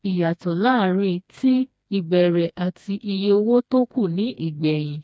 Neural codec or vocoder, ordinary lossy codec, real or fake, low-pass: codec, 16 kHz, 2 kbps, FreqCodec, smaller model; none; fake; none